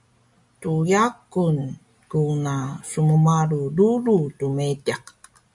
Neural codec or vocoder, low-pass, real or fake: none; 10.8 kHz; real